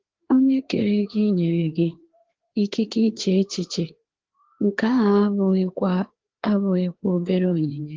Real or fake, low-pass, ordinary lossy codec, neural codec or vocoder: fake; 7.2 kHz; Opus, 16 kbps; codec, 16 kHz, 2 kbps, FreqCodec, larger model